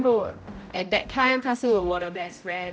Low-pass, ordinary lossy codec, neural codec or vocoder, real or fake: none; none; codec, 16 kHz, 0.5 kbps, X-Codec, HuBERT features, trained on general audio; fake